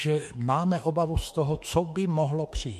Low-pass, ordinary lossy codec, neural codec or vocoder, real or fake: 14.4 kHz; MP3, 64 kbps; autoencoder, 48 kHz, 32 numbers a frame, DAC-VAE, trained on Japanese speech; fake